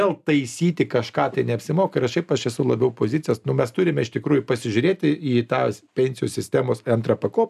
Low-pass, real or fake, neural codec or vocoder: 14.4 kHz; real; none